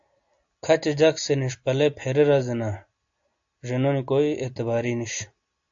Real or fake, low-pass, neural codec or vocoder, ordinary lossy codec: real; 7.2 kHz; none; AAC, 64 kbps